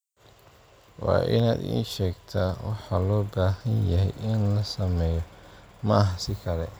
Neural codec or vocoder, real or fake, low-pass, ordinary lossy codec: none; real; none; none